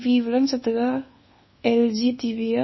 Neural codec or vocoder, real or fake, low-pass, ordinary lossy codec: codec, 24 kHz, 1.2 kbps, DualCodec; fake; 7.2 kHz; MP3, 24 kbps